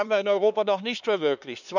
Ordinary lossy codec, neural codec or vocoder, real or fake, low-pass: none; codec, 16 kHz, 4 kbps, X-Codec, HuBERT features, trained on LibriSpeech; fake; 7.2 kHz